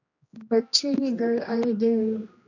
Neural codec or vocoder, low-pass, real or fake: codec, 16 kHz, 1 kbps, X-Codec, HuBERT features, trained on general audio; 7.2 kHz; fake